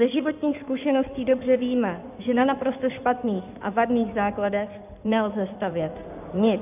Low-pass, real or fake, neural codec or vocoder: 3.6 kHz; fake; codec, 16 kHz in and 24 kHz out, 2.2 kbps, FireRedTTS-2 codec